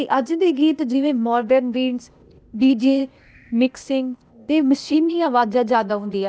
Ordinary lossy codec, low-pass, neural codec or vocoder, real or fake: none; none; codec, 16 kHz, 0.8 kbps, ZipCodec; fake